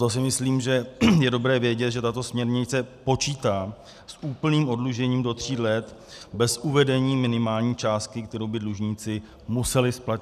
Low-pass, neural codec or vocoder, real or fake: 14.4 kHz; none; real